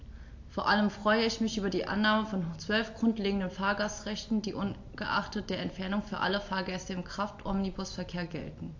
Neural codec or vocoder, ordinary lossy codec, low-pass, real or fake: none; AAC, 48 kbps; 7.2 kHz; real